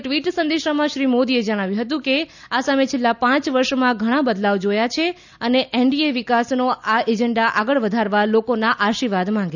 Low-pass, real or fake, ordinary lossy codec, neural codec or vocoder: 7.2 kHz; real; none; none